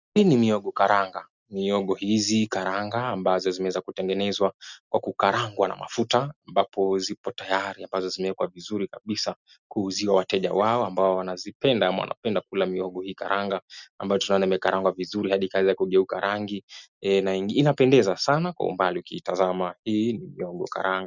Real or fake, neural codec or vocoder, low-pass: real; none; 7.2 kHz